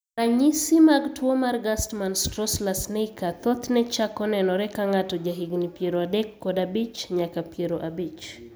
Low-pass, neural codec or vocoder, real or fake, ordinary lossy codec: none; none; real; none